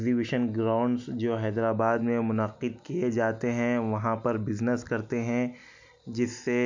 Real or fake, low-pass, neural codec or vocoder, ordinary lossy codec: real; 7.2 kHz; none; MP3, 64 kbps